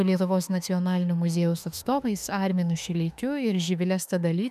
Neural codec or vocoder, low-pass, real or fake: autoencoder, 48 kHz, 32 numbers a frame, DAC-VAE, trained on Japanese speech; 14.4 kHz; fake